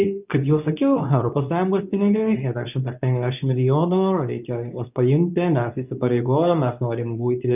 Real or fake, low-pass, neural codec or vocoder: fake; 3.6 kHz; codec, 24 kHz, 0.9 kbps, WavTokenizer, medium speech release version 2